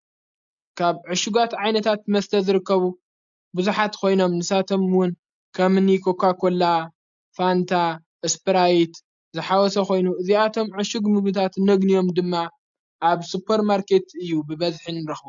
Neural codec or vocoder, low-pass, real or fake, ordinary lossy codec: none; 7.2 kHz; real; MP3, 64 kbps